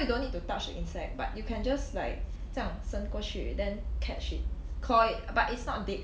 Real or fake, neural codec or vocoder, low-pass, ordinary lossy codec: real; none; none; none